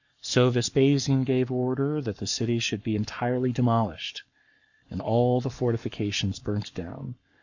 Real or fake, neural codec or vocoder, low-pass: fake; codec, 44.1 kHz, 7.8 kbps, Pupu-Codec; 7.2 kHz